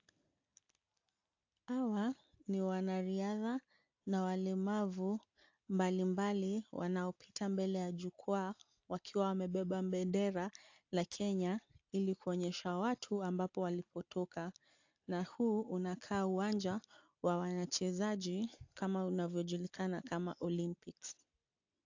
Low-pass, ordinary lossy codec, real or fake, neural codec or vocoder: 7.2 kHz; AAC, 48 kbps; real; none